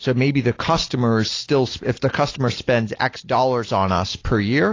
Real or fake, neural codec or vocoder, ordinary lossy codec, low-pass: real; none; AAC, 32 kbps; 7.2 kHz